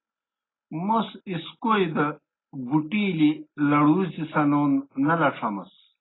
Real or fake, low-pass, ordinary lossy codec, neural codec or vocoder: real; 7.2 kHz; AAC, 16 kbps; none